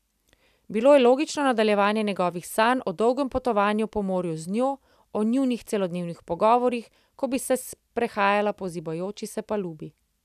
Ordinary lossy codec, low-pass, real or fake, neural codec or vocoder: none; 14.4 kHz; real; none